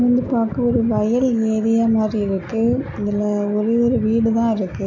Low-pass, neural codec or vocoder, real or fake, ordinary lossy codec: 7.2 kHz; none; real; none